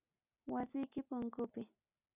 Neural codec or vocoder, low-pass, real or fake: none; 3.6 kHz; real